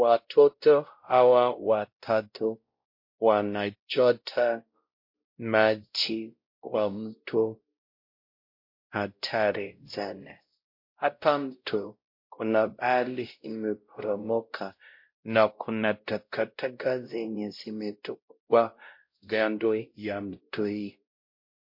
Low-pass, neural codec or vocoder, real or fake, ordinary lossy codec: 5.4 kHz; codec, 16 kHz, 0.5 kbps, X-Codec, WavLM features, trained on Multilingual LibriSpeech; fake; MP3, 32 kbps